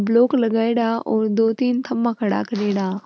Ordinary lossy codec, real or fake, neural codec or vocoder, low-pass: none; real; none; none